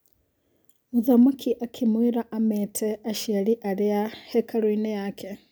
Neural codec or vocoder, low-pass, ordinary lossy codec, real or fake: none; none; none; real